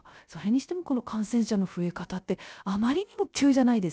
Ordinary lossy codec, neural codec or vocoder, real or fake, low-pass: none; codec, 16 kHz, 0.3 kbps, FocalCodec; fake; none